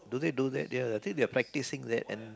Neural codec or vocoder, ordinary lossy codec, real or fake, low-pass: none; none; real; none